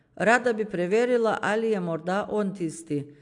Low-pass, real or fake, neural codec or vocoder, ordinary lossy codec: 10.8 kHz; real; none; AAC, 64 kbps